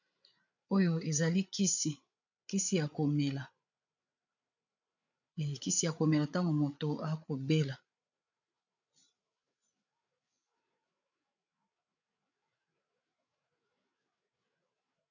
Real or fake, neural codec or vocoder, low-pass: fake; codec, 16 kHz, 8 kbps, FreqCodec, larger model; 7.2 kHz